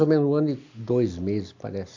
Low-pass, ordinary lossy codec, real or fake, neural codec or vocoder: 7.2 kHz; AAC, 48 kbps; real; none